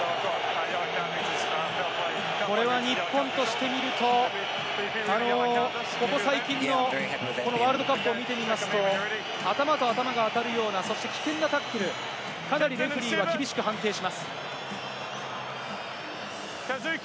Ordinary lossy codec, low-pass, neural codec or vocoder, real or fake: none; none; none; real